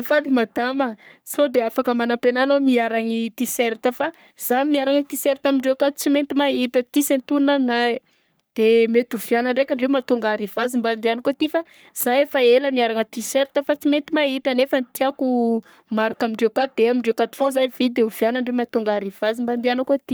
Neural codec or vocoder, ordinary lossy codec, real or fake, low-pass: codec, 44.1 kHz, 3.4 kbps, Pupu-Codec; none; fake; none